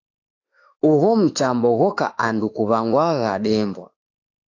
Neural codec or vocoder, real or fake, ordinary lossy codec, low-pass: autoencoder, 48 kHz, 32 numbers a frame, DAC-VAE, trained on Japanese speech; fake; AAC, 48 kbps; 7.2 kHz